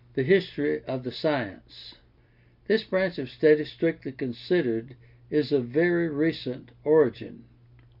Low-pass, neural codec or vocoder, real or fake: 5.4 kHz; none; real